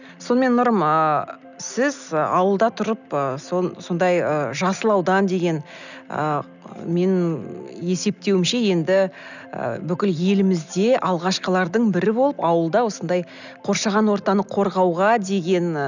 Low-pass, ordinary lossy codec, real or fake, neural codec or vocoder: 7.2 kHz; none; real; none